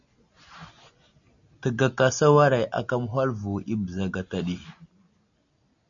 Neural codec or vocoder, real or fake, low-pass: none; real; 7.2 kHz